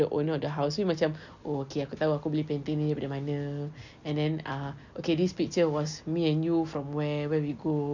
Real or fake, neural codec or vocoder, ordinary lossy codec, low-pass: real; none; none; 7.2 kHz